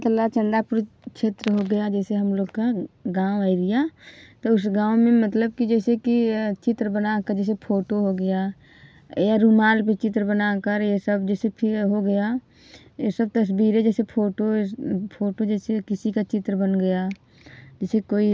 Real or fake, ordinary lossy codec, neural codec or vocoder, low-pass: real; none; none; none